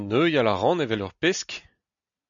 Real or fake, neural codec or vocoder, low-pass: real; none; 7.2 kHz